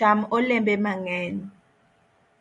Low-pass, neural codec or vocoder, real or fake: 10.8 kHz; vocoder, 44.1 kHz, 128 mel bands every 512 samples, BigVGAN v2; fake